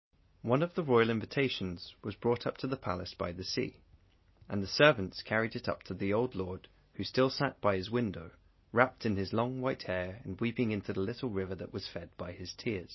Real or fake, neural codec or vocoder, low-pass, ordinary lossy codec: real; none; 7.2 kHz; MP3, 24 kbps